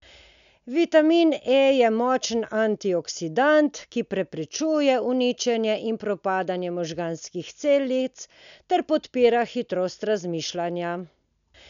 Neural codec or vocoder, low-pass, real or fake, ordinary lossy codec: none; 7.2 kHz; real; none